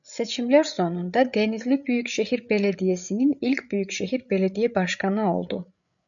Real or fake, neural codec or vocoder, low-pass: fake; codec, 16 kHz, 8 kbps, FreqCodec, larger model; 7.2 kHz